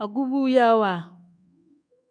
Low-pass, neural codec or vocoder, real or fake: 9.9 kHz; codec, 24 kHz, 1.2 kbps, DualCodec; fake